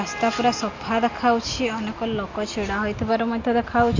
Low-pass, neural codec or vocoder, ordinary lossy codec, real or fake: 7.2 kHz; none; AAC, 48 kbps; real